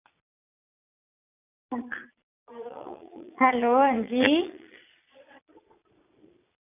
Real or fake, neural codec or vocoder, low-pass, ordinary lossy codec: fake; vocoder, 22.05 kHz, 80 mel bands, Vocos; 3.6 kHz; none